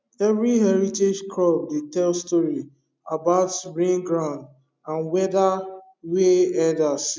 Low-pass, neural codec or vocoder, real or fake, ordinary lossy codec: none; none; real; none